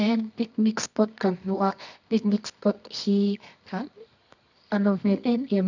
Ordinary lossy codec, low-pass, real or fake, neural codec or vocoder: none; 7.2 kHz; fake; codec, 24 kHz, 0.9 kbps, WavTokenizer, medium music audio release